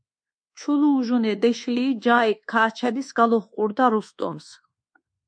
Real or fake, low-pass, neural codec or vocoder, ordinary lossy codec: fake; 9.9 kHz; codec, 24 kHz, 1.2 kbps, DualCodec; MP3, 64 kbps